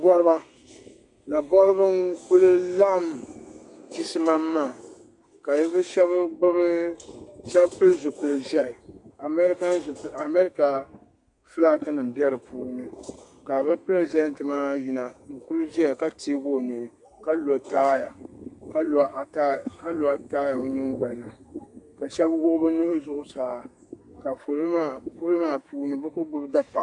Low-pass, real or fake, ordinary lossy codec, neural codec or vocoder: 10.8 kHz; fake; MP3, 64 kbps; codec, 32 kHz, 1.9 kbps, SNAC